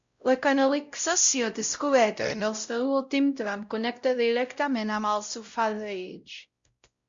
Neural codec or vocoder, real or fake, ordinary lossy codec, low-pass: codec, 16 kHz, 0.5 kbps, X-Codec, WavLM features, trained on Multilingual LibriSpeech; fake; Opus, 64 kbps; 7.2 kHz